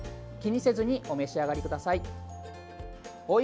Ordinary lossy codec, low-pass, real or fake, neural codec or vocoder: none; none; real; none